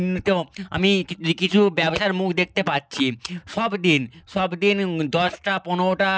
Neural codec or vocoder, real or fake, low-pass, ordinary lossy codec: none; real; none; none